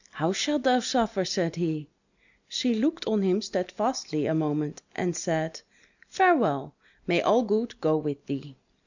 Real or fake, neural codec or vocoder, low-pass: real; none; 7.2 kHz